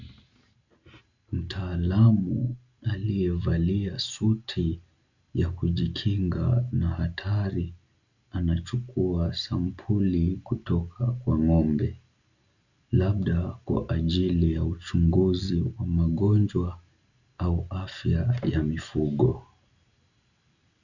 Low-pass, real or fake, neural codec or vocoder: 7.2 kHz; real; none